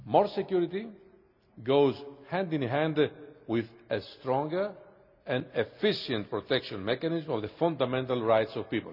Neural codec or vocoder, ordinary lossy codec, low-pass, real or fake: none; none; 5.4 kHz; real